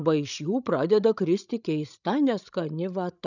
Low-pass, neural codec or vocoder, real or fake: 7.2 kHz; codec, 16 kHz, 16 kbps, FreqCodec, larger model; fake